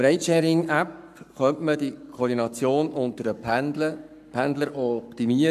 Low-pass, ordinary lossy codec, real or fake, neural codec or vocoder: 14.4 kHz; none; fake; codec, 44.1 kHz, 7.8 kbps, Pupu-Codec